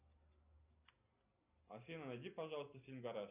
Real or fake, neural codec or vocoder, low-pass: real; none; 3.6 kHz